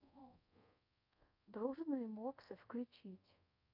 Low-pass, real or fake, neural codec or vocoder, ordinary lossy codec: 5.4 kHz; fake; codec, 24 kHz, 0.5 kbps, DualCodec; none